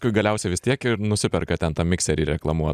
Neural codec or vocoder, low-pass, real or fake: none; 14.4 kHz; real